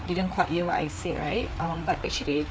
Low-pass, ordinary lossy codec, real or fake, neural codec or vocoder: none; none; fake; codec, 16 kHz, 4 kbps, FreqCodec, larger model